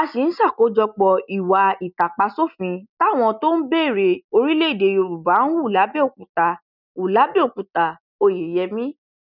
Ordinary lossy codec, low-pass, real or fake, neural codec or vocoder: none; 5.4 kHz; real; none